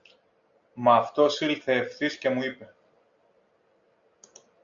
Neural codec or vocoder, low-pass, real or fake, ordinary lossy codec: none; 7.2 kHz; real; AAC, 64 kbps